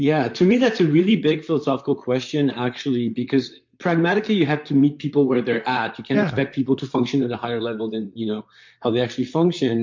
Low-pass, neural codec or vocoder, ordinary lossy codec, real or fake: 7.2 kHz; vocoder, 44.1 kHz, 128 mel bands, Pupu-Vocoder; MP3, 48 kbps; fake